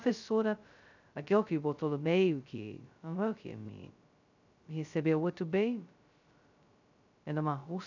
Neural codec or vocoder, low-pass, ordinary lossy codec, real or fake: codec, 16 kHz, 0.2 kbps, FocalCodec; 7.2 kHz; none; fake